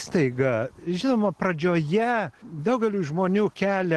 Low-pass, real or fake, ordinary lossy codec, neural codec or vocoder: 10.8 kHz; real; Opus, 16 kbps; none